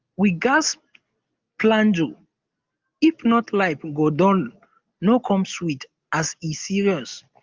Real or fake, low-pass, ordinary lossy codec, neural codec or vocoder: real; 7.2 kHz; Opus, 32 kbps; none